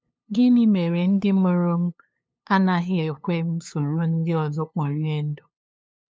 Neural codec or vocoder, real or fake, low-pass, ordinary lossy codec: codec, 16 kHz, 2 kbps, FunCodec, trained on LibriTTS, 25 frames a second; fake; none; none